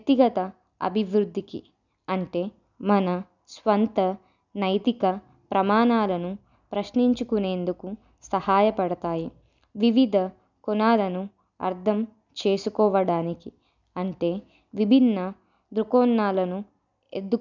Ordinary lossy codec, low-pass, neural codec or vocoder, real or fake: none; 7.2 kHz; none; real